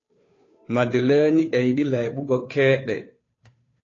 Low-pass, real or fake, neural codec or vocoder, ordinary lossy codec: 7.2 kHz; fake; codec, 16 kHz, 2 kbps, FunCodec, trained on Chinese and English, 25 frames a second; AAC, 32 kbps